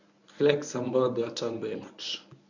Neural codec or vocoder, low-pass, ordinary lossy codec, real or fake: codec, 24 kHz, 0.9 kbps, WavTokenizer, medium speech release version 1; 7.2 kHz; none; fake